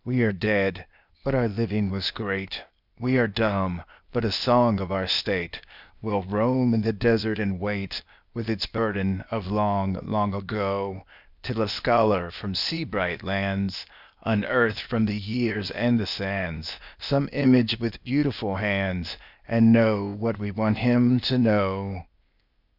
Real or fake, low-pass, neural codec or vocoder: fake; 5.4 kHz; codec, 16 kHz, 0.8 kbps, ZipCodec